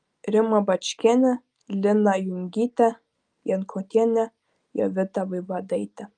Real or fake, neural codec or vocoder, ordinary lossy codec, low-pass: real; none; Opus, 32 kbps; 9.9 kHz